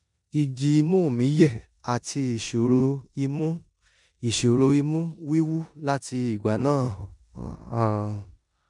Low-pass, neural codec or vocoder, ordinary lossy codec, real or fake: 10.8 kHz; codec, 16 kHz in and 24 kHz out, 0.9 kbps, LongCat-Audio-Codec, four codebook decoder; MP3, 96 kbps; fake